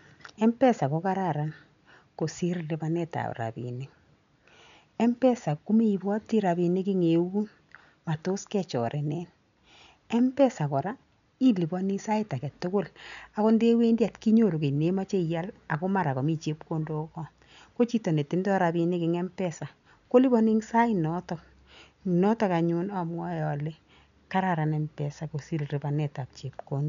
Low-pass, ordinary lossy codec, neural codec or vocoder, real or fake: 7.2 kHz; none; none; real